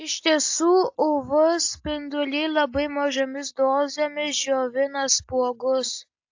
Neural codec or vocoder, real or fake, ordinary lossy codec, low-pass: none; real; AAC, 48 kbps; 7.2 kHz